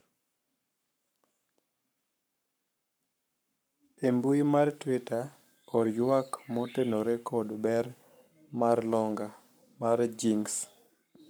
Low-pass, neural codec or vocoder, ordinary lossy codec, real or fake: none; codec, 44.1 kHz, 7.8 kbps, Pupu-Codec; none; fake